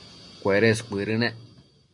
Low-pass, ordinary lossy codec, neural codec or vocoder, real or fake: 10.8 kHz; MP3, 96 kbps; none; real